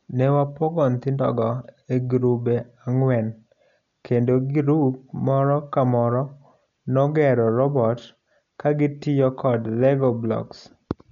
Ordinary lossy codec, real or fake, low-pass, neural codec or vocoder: none; real; 7.2 kHz; none